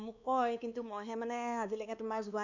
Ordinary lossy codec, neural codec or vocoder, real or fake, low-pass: none; codec, 16 kHz, 2 kbps, X-Codec, WavLM features, trained on Multilingual LibriSpeech; fake; 7.2 kHz